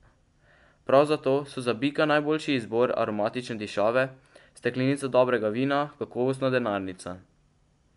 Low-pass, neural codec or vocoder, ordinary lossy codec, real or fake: 10.8 kHz; none; MP3, 96 kbps; real